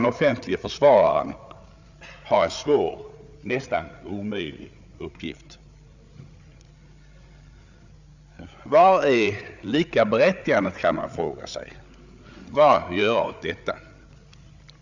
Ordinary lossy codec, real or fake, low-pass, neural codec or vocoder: Opus, 64 kbps; fake; 7.2 kHz; codec, 16 kHz, 8 kbps, FreqCodec, larger model